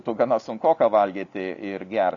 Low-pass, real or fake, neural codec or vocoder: 7.2 kHz; real; none